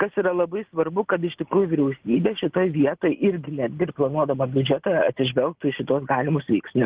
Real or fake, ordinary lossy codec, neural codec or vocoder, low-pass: real; Opus, 16 kbps; none; 3.6 kHz